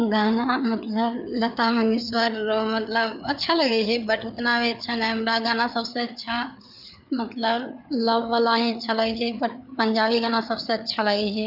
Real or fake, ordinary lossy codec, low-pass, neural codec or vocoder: fake; none; 5.4 kHz; codec, 16 kHz, 4 kbps, FreqCodec, larger model